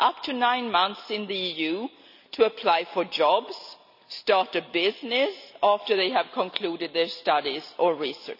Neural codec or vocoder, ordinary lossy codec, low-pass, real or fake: none; none; 5.4 kHz; real